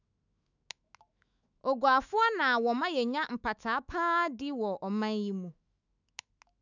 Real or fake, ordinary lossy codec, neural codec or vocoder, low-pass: fake; none; autoencoder, 48 kHz, 128 numbers a frame, DAC-VAE, trained on Japanese speech; 7.2 kHz